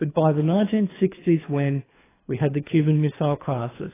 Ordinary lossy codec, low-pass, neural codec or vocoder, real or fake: AAC, 16 kbps; 3.6 kHz; codec, 24 kHz, 3 kbps, HILCodec; fake